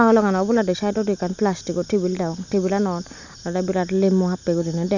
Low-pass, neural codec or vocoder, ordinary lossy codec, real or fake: 7.2 kHz; none; none; real